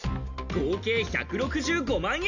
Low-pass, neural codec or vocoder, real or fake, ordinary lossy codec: 7.2 kHz; none; real; none